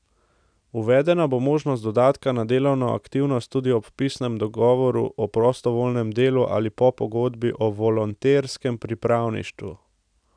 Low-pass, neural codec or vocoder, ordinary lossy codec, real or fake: 9.9 kHz; none; none; real